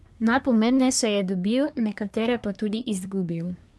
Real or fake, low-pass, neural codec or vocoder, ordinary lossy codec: fake; none; codec, 24 kHz, 1 kbps, SNAC; none